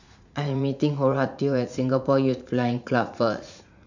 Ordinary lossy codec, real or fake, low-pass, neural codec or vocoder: none; fake; 7.2 kHz; vocoder, 44.1 kHz, 80 mel bands, Vocos